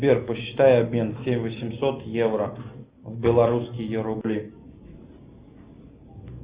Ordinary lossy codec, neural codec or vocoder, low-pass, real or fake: Opus, 64 kbps; none; 3.6 kHz; real